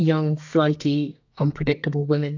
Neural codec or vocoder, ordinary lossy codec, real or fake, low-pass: codec, 32 kHz, 1.9 kbps, SNAC; AAC, 48 kbps; fake; 7.2 kHz